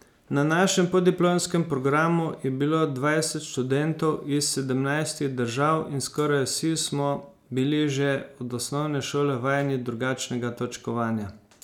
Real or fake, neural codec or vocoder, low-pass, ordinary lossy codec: real; none; 19.8 kHz; none